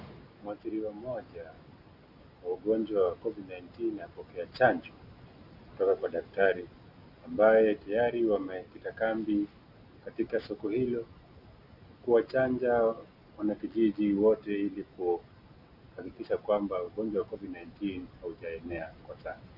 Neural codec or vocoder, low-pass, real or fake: none; 5.4 kHz; real